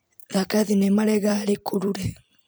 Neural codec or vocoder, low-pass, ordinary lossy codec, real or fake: vocoder, 44.1 kHz, 128 mel bands every 256 samples, BigVGAN v2; none; none; fake